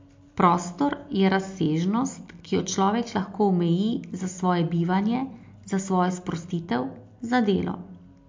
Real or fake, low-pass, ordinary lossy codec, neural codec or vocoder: real; 7.2 kHz; MP3, 48 kbps; none